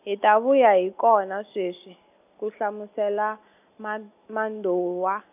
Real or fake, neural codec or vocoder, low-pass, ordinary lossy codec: real; none; 3.6 kHz; none